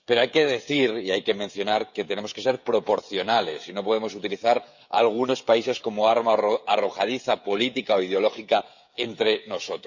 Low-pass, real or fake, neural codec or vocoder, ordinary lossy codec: 7.2 kHz; fake; codec, 16 kHz, 16 kbps, FreqCodec, smaller model; none